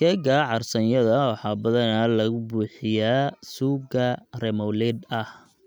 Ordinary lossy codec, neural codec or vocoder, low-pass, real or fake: none; none; none; real